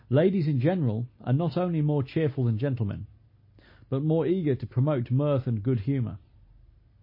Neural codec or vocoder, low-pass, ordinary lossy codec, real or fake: none; 5.4 kHz; MP3, 24 kbps; real